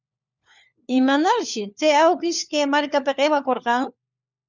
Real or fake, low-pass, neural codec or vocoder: fake; 7.2 kHz; codec, 16 kHz, 4 kbps, FunCodec, trained on LibriTTS, 50 frames a second